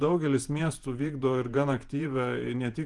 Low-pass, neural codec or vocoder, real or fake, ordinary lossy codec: 10.8 kHz; vocoder, 48 kHz, 128 mel bands, Vocos; fake; Opus, 24 kbps